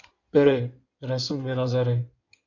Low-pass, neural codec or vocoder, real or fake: 7.2 kHz; codec, 16 kHz in and 24 kHz out, 2.2 kbps, FireRedTTS-2 codec; fake